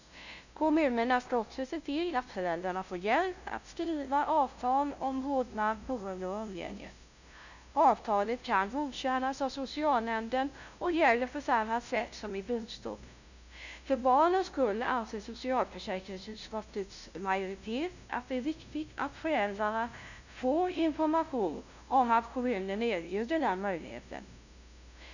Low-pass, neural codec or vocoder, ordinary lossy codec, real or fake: 7.2 kHz; codec, 16 kHz, 0.5 kbps, FunCodec, trained on LibriTTS, 25 frames a second; none; fake